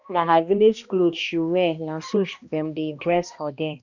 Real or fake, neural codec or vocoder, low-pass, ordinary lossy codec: fake; codec, 16 kHz, 1 kbps, X-Codec, HuBERT features, trained on balanced general audio; 7.2 kHz; none